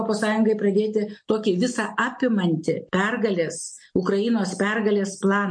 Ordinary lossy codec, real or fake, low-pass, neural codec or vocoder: MP3, 48 kbps; real; 9.9 kHz; none